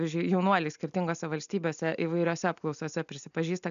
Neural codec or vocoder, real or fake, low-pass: none; real; 7.2 kHz